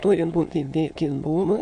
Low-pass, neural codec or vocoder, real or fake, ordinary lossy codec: 9.9 kHz; autoencoder, 22.05 kHz, a latent of 192 numbers a frame, VITS, trained on many speakers; fake; AAC, 64 kbps